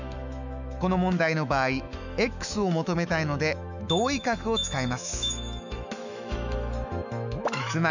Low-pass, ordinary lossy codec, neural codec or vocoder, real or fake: 7.2 kHz; none; autoencoder, 48 kHz, 128 numbers a frame, DAC-VAE, trained on Japanese speech; fake